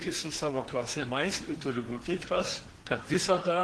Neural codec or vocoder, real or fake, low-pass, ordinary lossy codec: codec, 24 kHz, 1 kbps, SNAC; fake; 10.8 kHz; Opus, 16 kbps